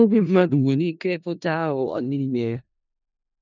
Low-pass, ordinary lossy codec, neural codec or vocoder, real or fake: 7.2 kHz; none; codec, 16 kHz in and 24 kHz out, 0.4 kbps, LongCat-Audio-Codec, four codebook decoder; fake